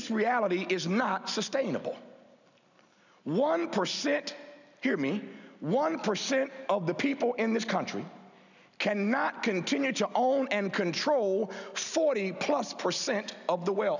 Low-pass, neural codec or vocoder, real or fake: 7.2 kHz; none; real